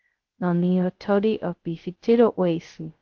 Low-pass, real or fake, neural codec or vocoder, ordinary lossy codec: 7.2 kHz; fake; codec, 16 kHz, 0.2 kbps, FocalCodec; Opus, 16 kbps